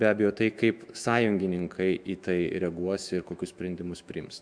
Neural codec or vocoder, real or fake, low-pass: autoencoder, 48 kHz, 128 numbers a frame, DAC-VAE, trained on Japanese speech; fake; 9.9 kHz